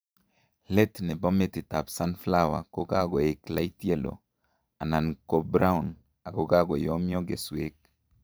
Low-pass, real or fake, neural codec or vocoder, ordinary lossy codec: none; fake; vocoder, 44.1 kHz, 128 mel bands every 512 samples, BigVGAN v2; none